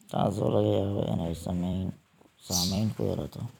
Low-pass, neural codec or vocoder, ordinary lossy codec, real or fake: 19.8 kHz; vocoder, 44.1 kHz, 128 mel bands every 256 samples, BigVGAN v2; none; fake